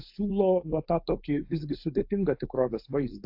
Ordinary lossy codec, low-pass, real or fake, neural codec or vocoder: AAC, 48 kbps; 5.4 kHz; fake; codec, 16 kHz, 4.8 kbps, FACodec